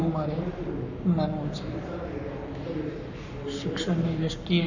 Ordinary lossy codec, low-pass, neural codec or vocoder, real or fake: none; 7.2 kHz; codec, 44.1 kHz, 7.8 kbps, Pupu-Codec; fake